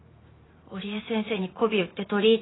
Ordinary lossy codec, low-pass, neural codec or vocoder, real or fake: AAC, 16 kbps; 7.2 kHz; vocoder, 44.1 kHz, 128 mel bands every 512 samples, BigVGAN v2; fake